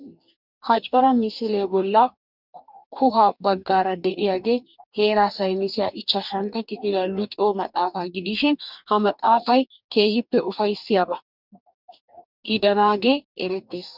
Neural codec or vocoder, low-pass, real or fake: codec, 44.1 kHz, 2.6 kbps, DAC; 5.4 kHz; fake